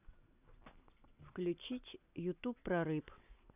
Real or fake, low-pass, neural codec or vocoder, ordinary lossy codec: real; 3.6 kHz; none; none